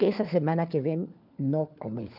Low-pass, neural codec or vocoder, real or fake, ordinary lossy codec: 5.4 kHz; codec, 16 kHz, 4 kbps, FunCodec, trained on LibriTTS, 50 frames a second; fake; none